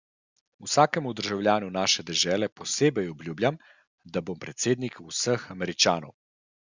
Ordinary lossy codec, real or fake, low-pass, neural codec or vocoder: Opus, 64 kbps; real; 7.2 kHz; none